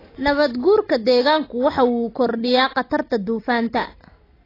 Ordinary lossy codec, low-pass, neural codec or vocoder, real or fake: AAC, 24 kbps; 5.4 kHz; none; real